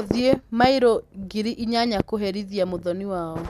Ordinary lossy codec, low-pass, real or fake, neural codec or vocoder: none; 14.4 kHz; real; none